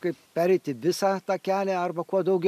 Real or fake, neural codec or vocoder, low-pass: real; none; 14.4 kHz